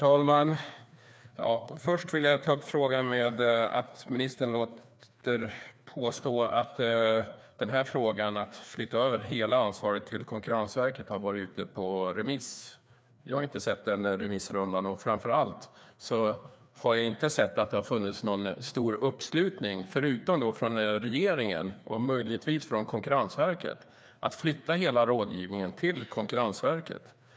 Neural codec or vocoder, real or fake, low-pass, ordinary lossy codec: codec, 16 kHz, 2 kbps, FreqCodec, larger model; fake; none; none